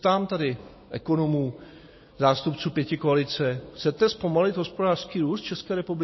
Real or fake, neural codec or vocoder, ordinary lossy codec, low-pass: real; none; MP3, 24 kbps; 7.2 kHz